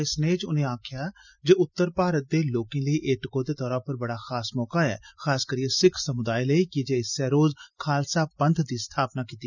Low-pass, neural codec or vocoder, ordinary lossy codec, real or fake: 7.2 kHz; none; none; real